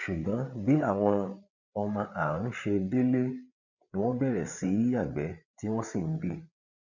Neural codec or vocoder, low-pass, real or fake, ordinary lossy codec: codec, 16 kHz, 8 kbps, FreqCodec, larger model; 7.2 kHz; fake; none